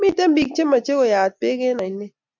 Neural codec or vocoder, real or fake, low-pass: none; real; 7.2 kHz